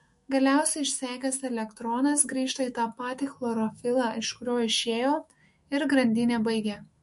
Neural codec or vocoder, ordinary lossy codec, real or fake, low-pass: autoencoder, 48 kHz, 128 numbers a frame, DAC-VAE, trained on Japanese speech; MP3, 48 kbps; fake; 14.4 kHz